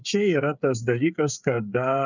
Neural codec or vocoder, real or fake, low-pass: codec, 16 kHz, 8 kbps, FreqCodec, smaller model; fake; 7.2 kHz